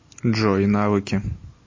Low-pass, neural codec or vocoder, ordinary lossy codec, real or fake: 7.2 kHz; none; MP3, 32 kbps; real